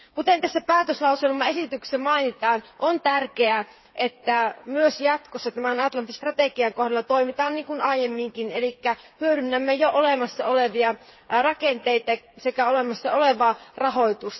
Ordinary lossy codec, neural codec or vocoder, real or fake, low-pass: MP3, 24 kbps; codec, 16 kHz, 8 kbps, FreqCodec, smaller model; fake; 7.2 kHz